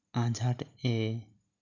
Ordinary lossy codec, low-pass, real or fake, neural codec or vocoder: none; 7.2 kHz; real; none